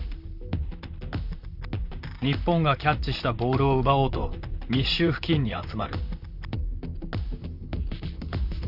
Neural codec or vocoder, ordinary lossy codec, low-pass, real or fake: vocoder, 44.1 kHz, 128 mel bands, Pupu-Vocoder; none; 5.4 kHz; fake